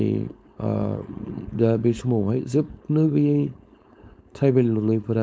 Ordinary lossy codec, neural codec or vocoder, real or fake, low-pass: none; codec, 16 kHz, 4.8 kbps, FACodec; fake; none